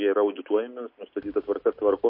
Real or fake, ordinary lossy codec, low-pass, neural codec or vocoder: real; MP3, 32 kbps; 5.4 kHz; none